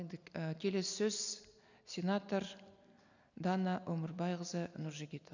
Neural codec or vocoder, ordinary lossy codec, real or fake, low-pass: none; none; real; 7.2 kHz